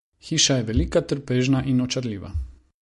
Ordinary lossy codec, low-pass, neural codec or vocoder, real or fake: MP3, 48 kbps; 14.4 kHz; none; real